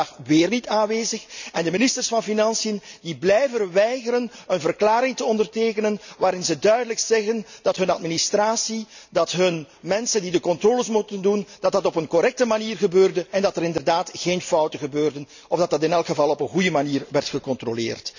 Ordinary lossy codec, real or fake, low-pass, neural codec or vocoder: none; real; 7.2 kHz; none